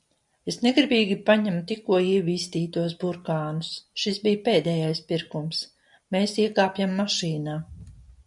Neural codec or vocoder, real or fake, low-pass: none; real; 10.8 kHz